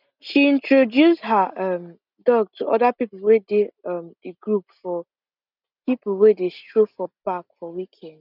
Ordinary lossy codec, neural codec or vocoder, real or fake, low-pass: none; none; real; 5.4 kHz